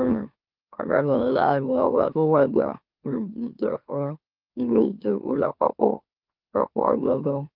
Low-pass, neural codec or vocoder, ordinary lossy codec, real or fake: 5.4 kHz; autoencoder, 44.1 kHz, a latent of 192 numbers a frame, MeloTTS; Opus, 24 kbps; fake